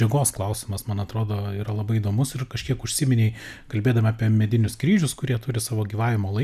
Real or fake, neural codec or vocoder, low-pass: real; none; 14.4 kHz